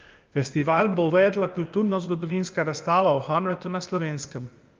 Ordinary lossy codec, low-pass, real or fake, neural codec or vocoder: Opus, 24 kbps; 7.2 kHz; fake; codec, 16 kHz, 0.8 kbps, ZipCodec